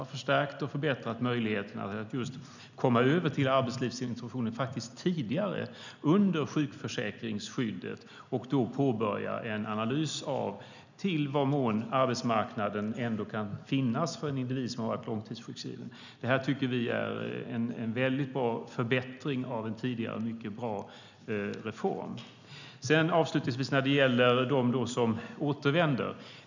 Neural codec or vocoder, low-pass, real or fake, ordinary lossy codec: none; 7.2 kHz; real; none